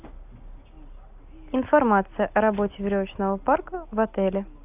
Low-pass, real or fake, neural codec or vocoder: 3.6 kHz; real; none